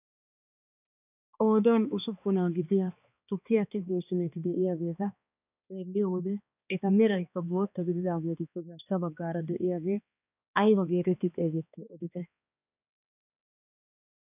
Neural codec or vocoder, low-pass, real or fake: codec, 16 kHz, 2 kbps, X-Codec, HuBERT features, trained on balanced general audio; 3.6 kHz; fake